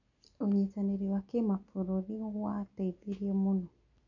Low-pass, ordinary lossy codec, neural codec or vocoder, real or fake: 7.2 kHz; none; none; real